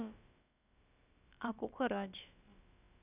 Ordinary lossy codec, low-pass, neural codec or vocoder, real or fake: none; 3.6 kHz; codec, 16 kHz, about 1 kbps, DyCAST, with the encoder's durations; fake